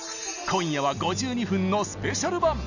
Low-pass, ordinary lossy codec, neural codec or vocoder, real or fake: 7.2 kHz; none; none; real